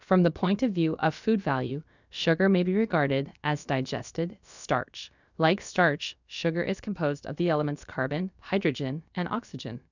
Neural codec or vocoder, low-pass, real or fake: codec, 16 kHz, about 1 kbps, DyCAST, with the encoder's durations; 7.2 kHz; fake